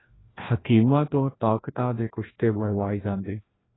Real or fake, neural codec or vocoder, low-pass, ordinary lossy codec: fake; codec, 16 kHz, 1 kbps, FreqCodec, larger model; 7.2 kHz; AAC, 16 kbps